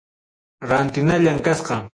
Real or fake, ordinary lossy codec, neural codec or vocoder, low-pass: fake; AAC, 48 kbps; vocoder, 48 kHz, 128 mel bands, Vocos; 9.9 kHz